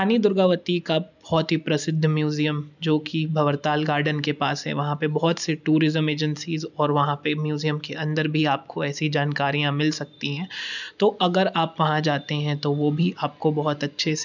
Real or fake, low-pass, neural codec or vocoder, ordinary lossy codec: real; 7.2 kHz; none; none